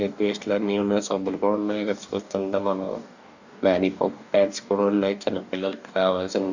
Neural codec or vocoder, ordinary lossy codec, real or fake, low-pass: codec, 44.1 kHz, 2.6 kbps, DAC; none; fake; 7.2 kHz